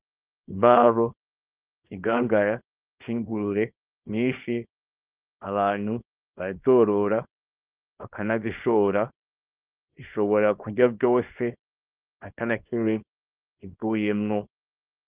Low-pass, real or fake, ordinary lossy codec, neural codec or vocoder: 3.6 kHz; fake; Opus, 32 kbps; codec, 24 kHz, 0.9 kbps, WavTokenizer, small release